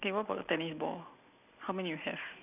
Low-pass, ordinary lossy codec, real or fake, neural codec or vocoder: 3.6 kHz; none; real; none